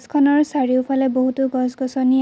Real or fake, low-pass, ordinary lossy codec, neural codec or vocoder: real; none; none; none